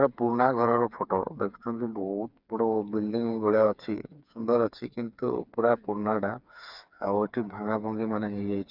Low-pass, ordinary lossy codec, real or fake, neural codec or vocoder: 5.4 kHz; none; fake; codec, 16 kHz, 4 kbps, FreqCodec, smaller model